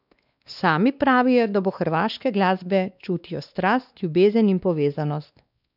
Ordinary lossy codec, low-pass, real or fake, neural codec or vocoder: none; 5.4 kHz; fake; codec, 16 kHz, 2 kbps, X-Codec, WavLM features, trained on Multilingual LibriSpeech